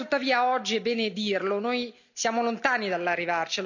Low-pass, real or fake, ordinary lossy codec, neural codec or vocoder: 7.2 kHz; real; none; none